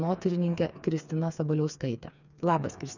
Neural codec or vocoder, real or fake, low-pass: codec, 16 kHz, 4 kbps, FreqCodec, smaller model; fake; 7.2 kHz